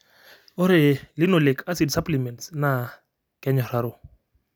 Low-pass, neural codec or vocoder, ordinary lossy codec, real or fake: none; none; none; real